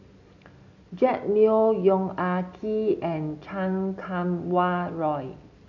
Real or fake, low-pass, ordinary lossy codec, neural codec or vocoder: real; 7.2 kHz; none; none